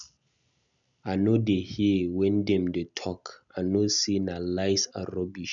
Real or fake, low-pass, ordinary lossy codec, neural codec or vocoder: real; 7.2 kHz; none; none